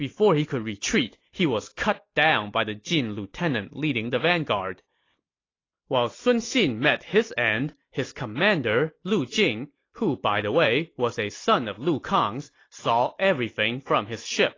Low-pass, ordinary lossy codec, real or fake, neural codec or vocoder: 7.2 kHz; AAC, 32 kbps; real; none